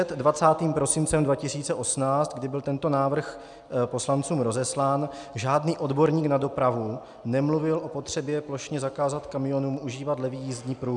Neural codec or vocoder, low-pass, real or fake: none; 10.8 kHz; real